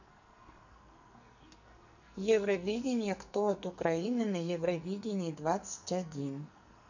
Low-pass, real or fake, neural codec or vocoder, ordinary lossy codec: 7.2 kHz; fake; codec, 44.1 kHz, 2.6 kbps, SNAC; none